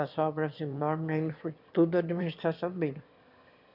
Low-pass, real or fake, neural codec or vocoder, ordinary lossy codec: 5.4 kHz; fake; autoencoder, 22.05 kHz, a latent of 192 numbers a frame, VITS, trained on one speaker; none